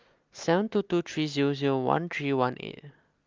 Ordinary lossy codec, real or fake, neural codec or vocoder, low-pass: Opus, 24 kbps; real; none; 7.2 kHz